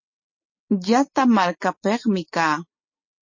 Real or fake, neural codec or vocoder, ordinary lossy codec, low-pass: real; none; MP3, 32 kbps; 7.2 kHz